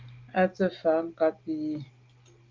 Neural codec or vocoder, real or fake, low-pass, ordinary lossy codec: none; real; 7.2 kHz; Opus, 24 kbps